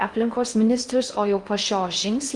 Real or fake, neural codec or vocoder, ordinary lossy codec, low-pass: fake; codec, 16 kHz in and 24 kHz out, 0.6 kbps, FocalCodec, streaming, 4096 codes; Opus, 24 kbps; 10.8 kHz